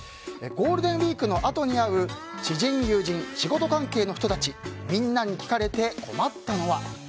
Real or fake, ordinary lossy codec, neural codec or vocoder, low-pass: real; none; none; none